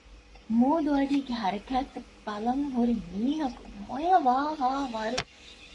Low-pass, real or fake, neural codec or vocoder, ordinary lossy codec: 10.8 kHz; fake; codec, 44.1 kHz, 7.8 kbps, Pupu-Codec; MP3, 48 kbps